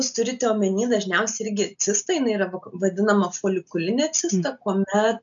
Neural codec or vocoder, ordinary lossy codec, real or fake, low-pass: none; MP3, 96 kbps; real; 7.2 kHz